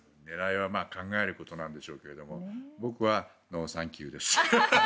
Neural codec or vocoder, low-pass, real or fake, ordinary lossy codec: none; none; real; none